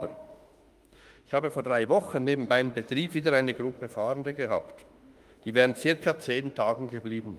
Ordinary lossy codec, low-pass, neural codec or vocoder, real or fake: Opus, 32 kbps; 14.4 kHz; autoencoder, 48 kHz, 32 numbers a frame, DAC-VAE, trained on Japanese speech; fake